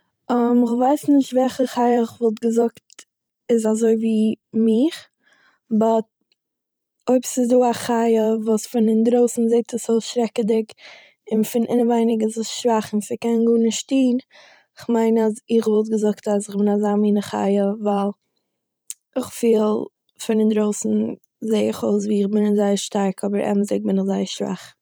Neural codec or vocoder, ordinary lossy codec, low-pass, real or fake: vocoder, 44.1 kHz, 128 mel bands every 512 samples, BigVGAN v2; none; none; fake